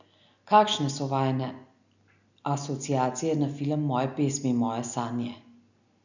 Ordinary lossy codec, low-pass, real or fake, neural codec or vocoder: none; 7.2 kHz; real; none